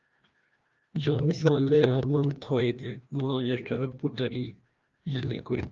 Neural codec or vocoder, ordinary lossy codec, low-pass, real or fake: codec, 16 kHz, 1 kbps, FreqCodec, larger model; Opus, 32 kbps; 7.2 kHz; fake